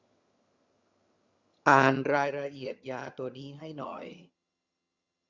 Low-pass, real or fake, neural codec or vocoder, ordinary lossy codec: 7.2 kHz; fake; vocoder, 22.05 kHz, 80 mel bands, HiFi-GAN; Opus, 64 kbps